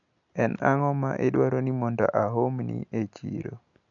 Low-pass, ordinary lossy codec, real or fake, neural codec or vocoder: 7.2 kHz; none; real; none